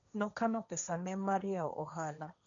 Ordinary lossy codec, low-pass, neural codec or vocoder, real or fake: none; 7.2 kHz; codec, 16 kHz, 1.1 kbps, Voila-Tokenizer; fake